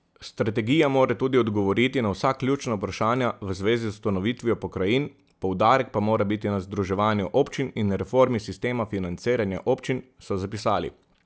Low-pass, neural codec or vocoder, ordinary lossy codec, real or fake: none; none; none; real